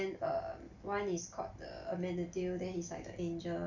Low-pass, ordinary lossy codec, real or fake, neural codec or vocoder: 7.2 kHz; none; real; none